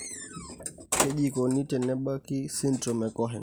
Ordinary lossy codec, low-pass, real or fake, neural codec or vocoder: none; none; real; none